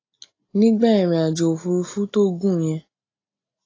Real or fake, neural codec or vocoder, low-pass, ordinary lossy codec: real; none; 7.2 kHz; AAC, 32 kbps